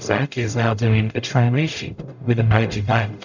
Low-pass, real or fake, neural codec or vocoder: 7.2 kHz; fake; codec, 44.1 kHz, 0.9 kbps, DAC